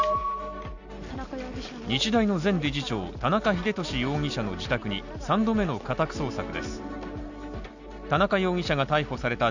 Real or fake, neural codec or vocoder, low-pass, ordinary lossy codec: real; none; 7.2 kHz; none